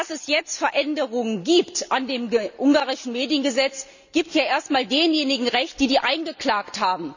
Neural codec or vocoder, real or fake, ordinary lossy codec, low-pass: none; real; none; 7.2 kHz